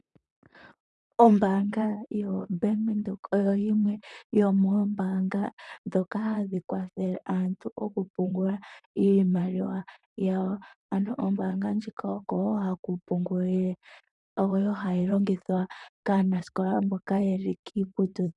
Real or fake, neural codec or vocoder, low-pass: fake; vocoder, 44.1 kHz, 128 mel bands, Pupu-Vocoder; 10.8 kHz